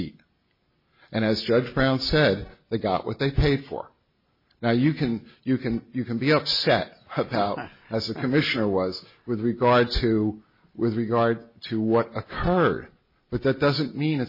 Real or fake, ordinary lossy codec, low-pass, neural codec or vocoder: real; MP3, 24 kbps; 5.4 kHz; none